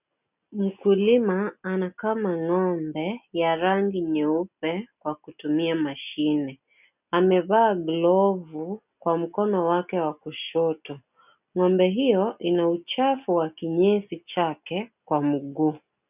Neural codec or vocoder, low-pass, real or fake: none; 3.6 kHz; real